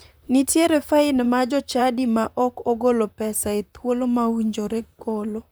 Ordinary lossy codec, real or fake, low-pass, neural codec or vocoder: none; fake; none; vocoder, 44.1 kHz, 128 mel bands, Pupu-Vocoder